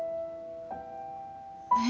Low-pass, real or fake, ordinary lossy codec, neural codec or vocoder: none; real; none; none